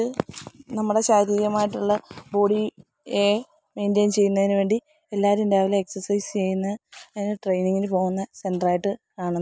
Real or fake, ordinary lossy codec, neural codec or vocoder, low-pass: real; none; none; none